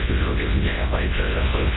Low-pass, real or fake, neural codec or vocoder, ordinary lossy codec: 7.2 kHz; fake; codec, 24 kHz, 0.9 kbps, WavTokenizer, large speech release; AAC, 16 kbps